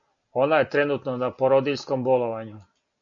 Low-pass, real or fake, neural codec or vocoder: 7.2 kHz; real; none